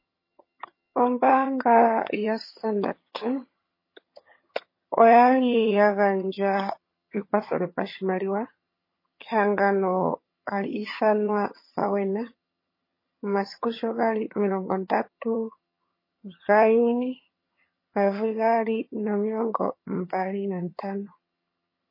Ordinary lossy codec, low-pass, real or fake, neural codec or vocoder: MP3, 24 kbps; 5.4 kHz; fake; vocoder, 22.05 kHz, 80 mel bands, HiFi-GAN